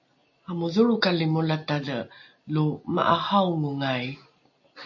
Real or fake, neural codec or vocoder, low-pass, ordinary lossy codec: real; none; 7.2 kHz; MP3, 32 kbps